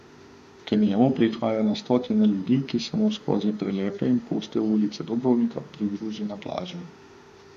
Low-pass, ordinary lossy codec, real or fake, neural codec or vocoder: 14.4 kHz; AAC, 96 kbps; fake; autoencoder, 48 kHz, 32 numbers a frame, DAC-VAE, trained on Japanese speech